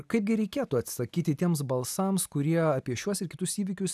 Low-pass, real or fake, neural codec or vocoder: 14.4 kHz; real; none